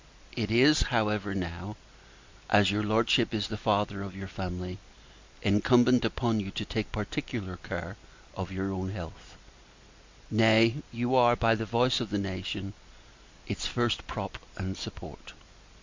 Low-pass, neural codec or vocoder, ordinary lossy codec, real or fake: 7.2 kHz; none; MP3, 64 kbps; real